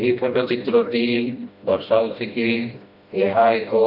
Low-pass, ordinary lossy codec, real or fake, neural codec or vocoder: 5.4 kHz; AAC, 48 kbps; fake; codec, 16 kHz, 1 kbps, FreqCodec, smaller model